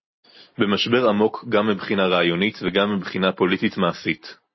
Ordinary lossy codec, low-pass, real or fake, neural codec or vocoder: MP3, 24 kbps; 7.2 kHz; real; none